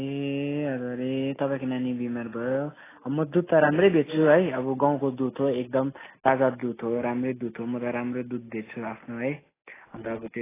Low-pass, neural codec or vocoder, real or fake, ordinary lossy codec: 3.6 kHz; none; real; AAC, 16 kbps